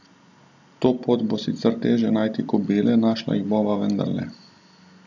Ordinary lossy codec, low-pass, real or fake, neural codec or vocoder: none; 7.2 kHz; real; none